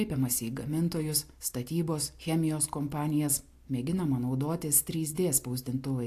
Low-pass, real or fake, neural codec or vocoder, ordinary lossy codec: 14.4 kHz; real; none; AAC, 64 kbps